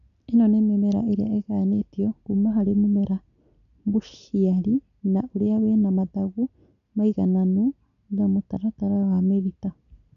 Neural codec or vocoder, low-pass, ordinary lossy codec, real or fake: none; 7.2 kHz; none; real